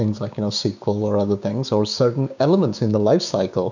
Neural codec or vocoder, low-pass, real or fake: codec, 16 kHz, 6 kbps, DAC; 7.2 kHz; fake